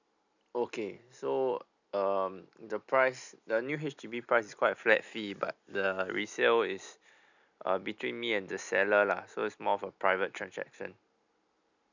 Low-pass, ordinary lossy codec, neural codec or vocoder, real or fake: 7.2 kHz; none; none; real